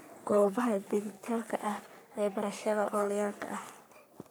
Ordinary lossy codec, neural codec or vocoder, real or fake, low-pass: none; codec, 44.1 kHz, 3.4 kbps, Pupu-Codec; fake; none